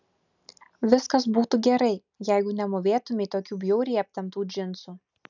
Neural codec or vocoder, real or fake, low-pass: none; real; 7.2 kHz